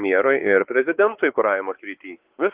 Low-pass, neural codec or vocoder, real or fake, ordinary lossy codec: 3.6 kHz; codec, 16 kHz, 4 kbps, X-Codec, WavLM features, trained on Multilingual LibriSpeech; fake; Opus, 16 kbps